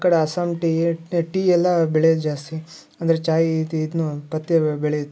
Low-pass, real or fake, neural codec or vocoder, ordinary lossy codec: none; real; none; none